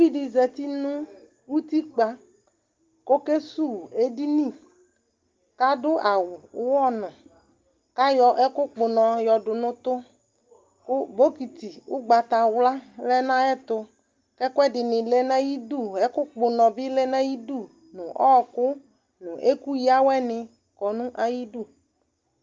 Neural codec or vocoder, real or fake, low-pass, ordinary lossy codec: none; real; 7.2 kHz; Opus, 32 kbps